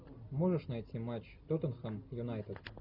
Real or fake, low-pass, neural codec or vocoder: real; 5.4 kHz; none